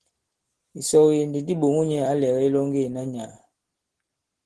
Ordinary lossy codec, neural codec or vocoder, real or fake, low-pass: Opus, 16 kbps; none; real; 10.8 kHz